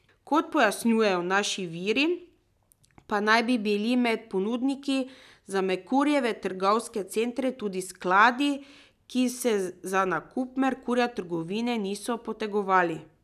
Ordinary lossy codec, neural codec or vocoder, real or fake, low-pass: none; none; real; 14.4 kHz